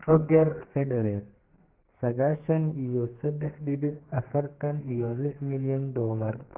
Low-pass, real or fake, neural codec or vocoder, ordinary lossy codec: 3.6 kHz; fake; codec, 32 kHz, 1.9 kbps, SNAC; Opus, 16 kbps